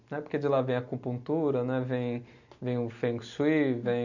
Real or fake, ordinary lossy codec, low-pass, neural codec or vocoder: real; none; 7.2 kHz; none